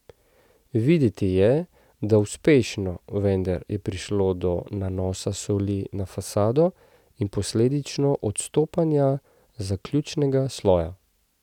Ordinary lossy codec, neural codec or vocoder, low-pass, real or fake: none; none; 19.8 kHz; real